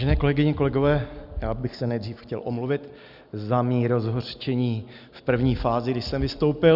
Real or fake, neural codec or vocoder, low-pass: real; none; 5.4 kHz